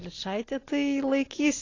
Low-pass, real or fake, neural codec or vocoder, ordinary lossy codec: 7.2 kHz; real; none; AAC, 32 kbps